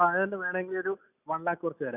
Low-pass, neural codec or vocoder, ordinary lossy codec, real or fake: 3.6 kHz; none; none; real